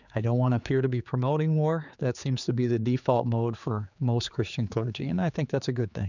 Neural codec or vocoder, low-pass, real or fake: codec, 16 kHz, 4 kbps, X-Codec, HuBERT features, trained on general audio; 7.2 kHz; fake